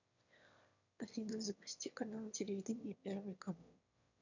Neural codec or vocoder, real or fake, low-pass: autoencoder, 22.05 kHz, a latent of 192 numbers a frame, VITS, trained on one speaker; fake; 7.2 kHz